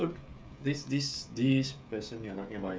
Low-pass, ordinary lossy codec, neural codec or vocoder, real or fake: none; none; codec, 16 kHz, 16 kbps, FreqCodec, smaller model; fake